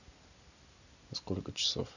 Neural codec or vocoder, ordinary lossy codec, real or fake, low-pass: none; none; real; 7.2 kHz